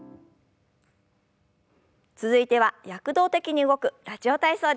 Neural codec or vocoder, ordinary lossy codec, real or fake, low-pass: none; none; real; none